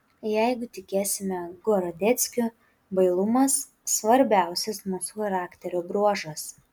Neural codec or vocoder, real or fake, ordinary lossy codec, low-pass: none; real; MP3, 96 kbps; 19.8 kHz